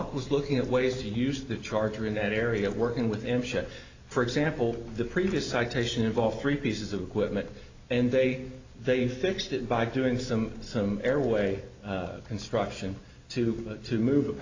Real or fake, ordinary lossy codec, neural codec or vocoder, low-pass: real; AAC, 48 kbps; none; 7.2 kHz